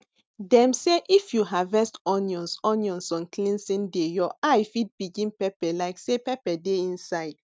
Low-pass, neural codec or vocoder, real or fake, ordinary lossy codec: none; none; real; none